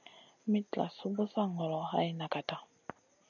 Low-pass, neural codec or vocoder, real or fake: 7.2 kHz; none; real